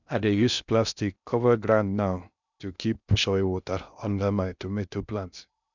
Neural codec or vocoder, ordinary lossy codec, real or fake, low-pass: codec, 16 kHz in and 24 kHz out, 0.6 kbps, FocalCodec, streaming, 2048 codes; none; fake; 7.2 kHz